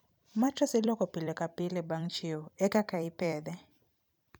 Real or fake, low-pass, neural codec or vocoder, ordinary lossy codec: fake; none; vocoder, 44.1 kHz, 128 mel bands every 256 samples, BigVGAN v2; none